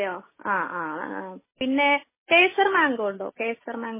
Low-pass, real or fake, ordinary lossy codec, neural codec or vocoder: 3.6 kHz; real; MP3, 16 kbps; none